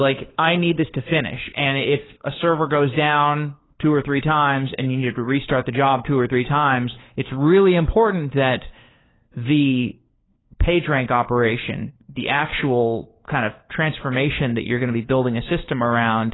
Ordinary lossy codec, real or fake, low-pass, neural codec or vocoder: AAC, 16 kbps; fake; 7.2 kHz; codec, 16 kHz, 4 kbps, FunCodec, trained on LibriTTS, 50 frames a second